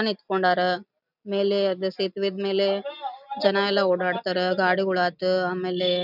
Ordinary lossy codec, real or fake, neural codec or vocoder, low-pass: none; real; none; 5.4 kHz